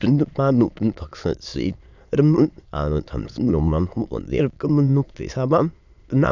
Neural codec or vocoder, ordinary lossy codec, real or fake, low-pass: autoencoder, 22.05 kHz, a latent of 192 numbers a frame, VITS, trained on many speakers; none; fake; 7.2 kHz